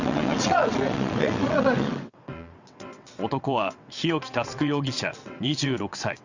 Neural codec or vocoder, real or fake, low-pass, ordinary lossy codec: vocoder, 22.05 kHz, 80 mel bands, WaveNeXt; fake; 7.2 kHz; Opus, 64 kbps